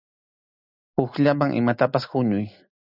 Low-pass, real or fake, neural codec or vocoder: 5.4 kHz; real; none